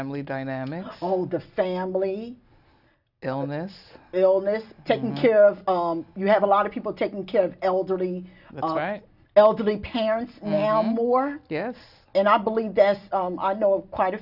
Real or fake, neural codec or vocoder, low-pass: real; none; 5.4 kHz